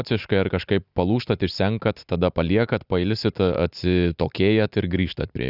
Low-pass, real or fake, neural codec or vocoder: 5.4 kHz; real; none